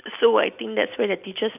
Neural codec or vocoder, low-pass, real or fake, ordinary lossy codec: none; 3.6 kHz; real; none